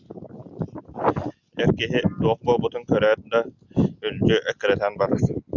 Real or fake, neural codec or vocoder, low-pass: real; none; 7.2 kHz